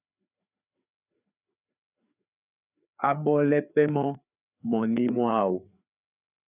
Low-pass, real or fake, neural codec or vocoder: 3.6 kHz; fake; codec, 16 kHz, 4 kbps, FreqCodec, larger model